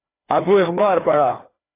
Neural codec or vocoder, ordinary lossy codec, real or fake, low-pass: codec, 16 kHz, 2 kbps, FreqCodec, larger model; AAC, 24 kbps; fake; 3.6 kHz